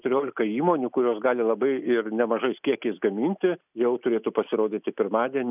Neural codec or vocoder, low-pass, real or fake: none; 3.6 kHz; real